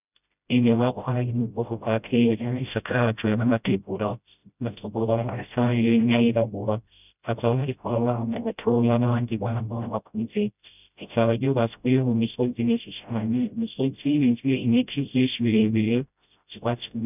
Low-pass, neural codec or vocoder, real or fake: 3.6 kHz; codec, 16 kHz, 0.5 kbps, FreqCodec, smaller model; fake